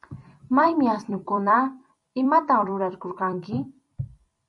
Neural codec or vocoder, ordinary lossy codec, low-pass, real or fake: vocoder, 44.1 kHz, 128 mel bands every 256 samples, BigVGAN v2; MP3, 64 kbps; 10.8 kHz; fake